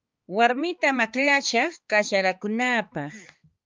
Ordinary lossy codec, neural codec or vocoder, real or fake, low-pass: Opus, 24 kbps; codec, 16 kHz, 4 kbps, X-Codec, HuBERT features, trained on balanced general audio; fake; 7.2 kHz